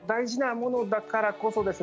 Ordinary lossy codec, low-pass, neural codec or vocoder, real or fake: none; none; none; real